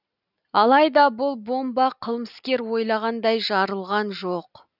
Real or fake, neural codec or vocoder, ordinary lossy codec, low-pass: real; none; none; 5.4 kHz